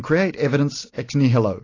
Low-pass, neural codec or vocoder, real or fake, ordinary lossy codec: 7.2 kHz; none; real; AAC, 32 kbps